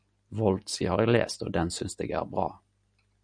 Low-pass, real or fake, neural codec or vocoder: 9.9 kHz; real; none